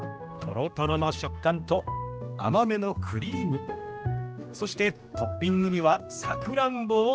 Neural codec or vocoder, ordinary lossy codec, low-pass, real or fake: codec, 16 kHz, 1 kbps, X-Codec, HuBERT features, trained on general audio; none; none; fake